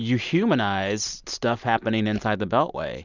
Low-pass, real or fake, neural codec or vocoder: 7.2 kHz; real; none